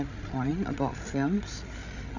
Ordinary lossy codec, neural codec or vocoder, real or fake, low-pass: none; codec, 16 kHz, 16 kbps, FreqCodec, larger model; fake; 7.2 kHz